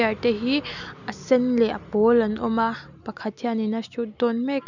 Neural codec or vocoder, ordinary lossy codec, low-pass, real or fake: none; none; 7.2 kHz; real